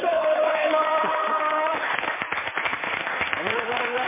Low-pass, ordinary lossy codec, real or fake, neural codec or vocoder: 3.6 kHz; MP3, 16 kbps; fake; codec, 16 kHz in and 24 kHz out, 2.2 kbps, FireRedTTS-2 codec